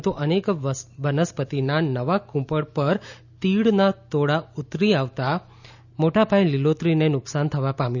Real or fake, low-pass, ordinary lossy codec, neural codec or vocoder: real; 7.2 kHz; none; none